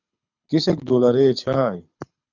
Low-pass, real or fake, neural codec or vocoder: 7.2 kHz; fake; codec, 24 kHz, 6 kbps, HILCodec